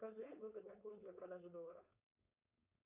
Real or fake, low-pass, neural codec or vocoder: fake; 3.6 kHz; codec, 16 kHz, 4.8 kbps, FACodec